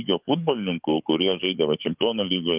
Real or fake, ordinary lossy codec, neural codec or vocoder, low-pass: fake; Opus, 32 kbps; codec, 44.1 kHz, 7.8 kbps, DAC; 3.6 kHz